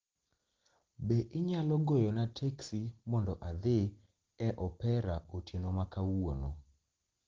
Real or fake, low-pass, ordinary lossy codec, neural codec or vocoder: real; 7.2 kHz; Opus, 16 kbps; none